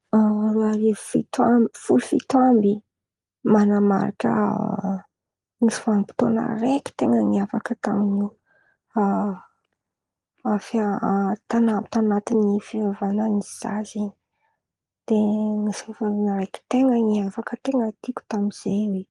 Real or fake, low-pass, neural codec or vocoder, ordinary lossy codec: real; 10.8 kHz; none; Opus, 24 kbps